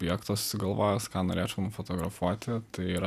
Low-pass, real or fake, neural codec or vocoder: 14.4 kHz; real; none